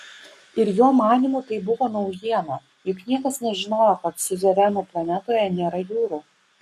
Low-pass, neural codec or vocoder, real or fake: 14.4 kHz; codec, 44.1 kHz, 7.8 kbps, Pupu-Codec; fake